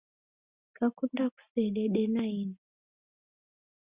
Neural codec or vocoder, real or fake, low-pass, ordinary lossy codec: none; real; 3.6 kHz; Opus, 64 kbps